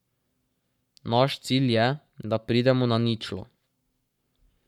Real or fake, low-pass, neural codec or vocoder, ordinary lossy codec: fake; 19.8 kHz; codec, 44.1 kHz, 7.8 kbps, Pupu-Codec; none